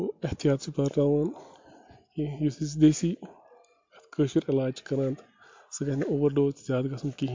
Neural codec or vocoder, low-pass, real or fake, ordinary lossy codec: none; 7.2 kHz; real; MP3, 48 kbps